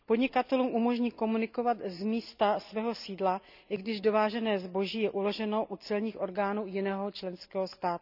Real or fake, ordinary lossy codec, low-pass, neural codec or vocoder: real; none; 5.4 kHz; none